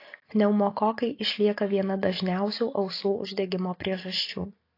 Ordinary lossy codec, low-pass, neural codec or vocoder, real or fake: AAC, 32 kbps; 5.4 kHz; none; real